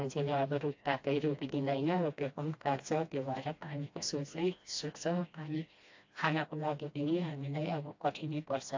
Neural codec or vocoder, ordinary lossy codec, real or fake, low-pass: codec, 16 kHz, 1 kbps, FreqCodec, smaller model; AAC, 48 kbps; fake; 7.2 kHz